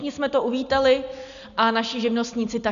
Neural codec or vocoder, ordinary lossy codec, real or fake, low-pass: none; MP3, 96 kbps; real; 7.2 kHz